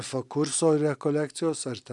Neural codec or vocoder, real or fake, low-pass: none; real; 10.8 kHz